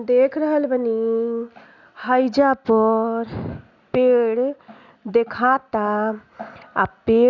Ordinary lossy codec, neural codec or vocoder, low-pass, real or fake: none; none; 7.2 kHz; real